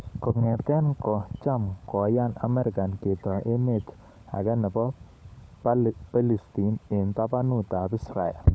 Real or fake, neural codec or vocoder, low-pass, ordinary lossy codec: fake; codec, 16 kHz, 16 kbps, FunCodec, trained on LibriTTS, 50 frames a second; none; none